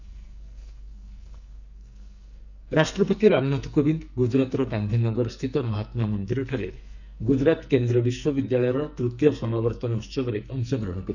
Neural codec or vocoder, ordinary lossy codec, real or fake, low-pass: codec, 44.1 kHz, 2.6 kbps, SNAC; none; fake; 7.2 kHz